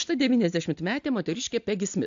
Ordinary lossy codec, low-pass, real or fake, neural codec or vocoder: AAC, 64 kbps; 7.2 kHz; real; none